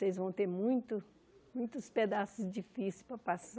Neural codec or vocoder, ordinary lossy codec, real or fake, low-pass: none; none; real; none